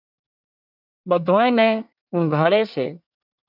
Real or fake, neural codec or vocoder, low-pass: fake; codec, 24 kHz, 1 kbps, SNAC; 5.4 kHz